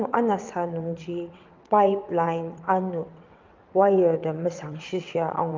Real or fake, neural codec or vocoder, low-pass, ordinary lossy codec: fake; vocoder, 22.05 kHz, 80 mel bands, WaveNeXt; 7.2 kHz; Opus, 24 kbps